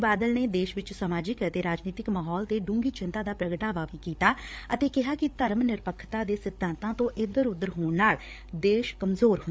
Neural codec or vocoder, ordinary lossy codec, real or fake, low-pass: codec, 16 kHz, 8 kbps, FreqCodec, larger model; none; fake; none